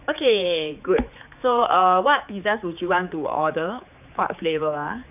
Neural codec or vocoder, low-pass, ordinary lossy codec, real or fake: codec, 16 kHz, 4 kbps, X-Codec, HuBERT features, trained on general audio; 3.6 kHz; none; fake